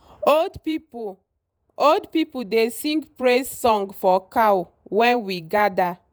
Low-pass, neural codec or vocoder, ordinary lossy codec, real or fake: none; vocoder, 48 kHz, 128 mel bands, Vocos; none; fake